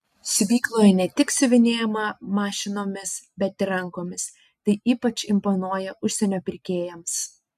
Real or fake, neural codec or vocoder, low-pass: real; none; 14.4 kHz